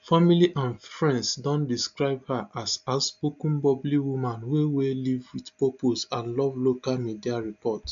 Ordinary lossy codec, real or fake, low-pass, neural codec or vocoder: MP3, 64 kbps; real; 7.2 kHz; none